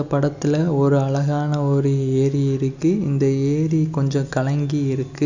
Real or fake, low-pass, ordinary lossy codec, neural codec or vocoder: real; 7.2 kHz; none; none